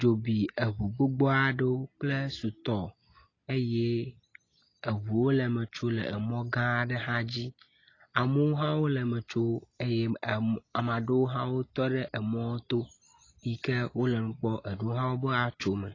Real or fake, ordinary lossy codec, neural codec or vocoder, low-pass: real; AAC, 32 kbps; none; 7.2 kHz